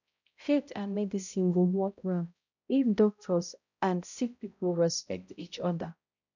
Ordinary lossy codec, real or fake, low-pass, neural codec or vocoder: none; fake; 7.2 kHz; codec, 16 kHz, 0.5 kbps, X-Codec, HuBERT features, trained on balanced general audio